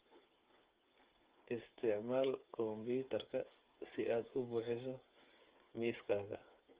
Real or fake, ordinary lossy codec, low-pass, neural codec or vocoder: fake; Opus, 24 kbps; 3.6 kHz; codec, 16 kHz, 8 kbps, FreqCodec, smaller model